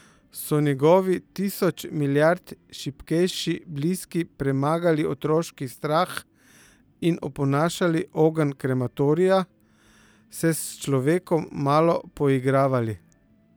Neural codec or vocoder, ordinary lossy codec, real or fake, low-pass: vocoder, 44.1 kHz, 128 mel bands every 256 samples, BigVGAN v2; none; fake; none